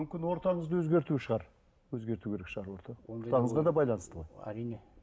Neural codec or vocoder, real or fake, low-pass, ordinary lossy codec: none; real; none; none